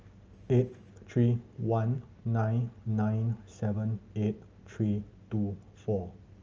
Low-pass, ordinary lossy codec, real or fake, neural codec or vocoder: 7.2 kHz; Opus, 24 kbps; real; none